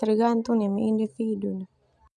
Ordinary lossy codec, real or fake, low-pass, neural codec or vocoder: none; real; none; none